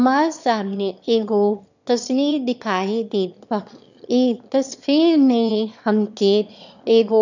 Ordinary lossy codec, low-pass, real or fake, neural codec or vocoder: none; 7.2 kHz; fake; autoencoder, 22.05 kHz, a latent of 192 numbers a frame, VITS, trained on one speaker